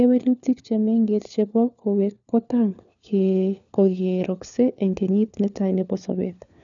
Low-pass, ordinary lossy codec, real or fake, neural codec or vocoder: 7.2 kHz; none; fake; codec, 16 kHz, 4 kbps, FunCodec, trained on LibriTTS, 50 frames a second